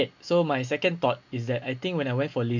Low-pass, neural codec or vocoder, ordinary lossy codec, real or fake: 7.2 kHz; none; none; real